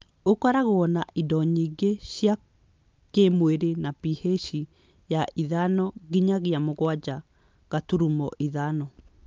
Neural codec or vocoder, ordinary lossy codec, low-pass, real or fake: none; Opus, 24 kbps; 7.2 kHz; real